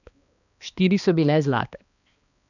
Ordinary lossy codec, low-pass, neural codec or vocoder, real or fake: none; 7.2 kHz; codec, 16 kHz, 2 kbps, X-Codec, HuBERT features, trained on balanced general audio; fake